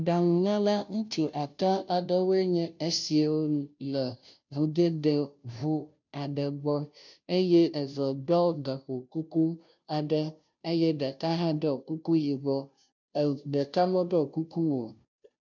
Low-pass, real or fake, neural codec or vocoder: 7.2 kHz; fake; codec, 16 kHz, 0.5 kbps, FunCodec, trained on Chinese and English, 25 frames a second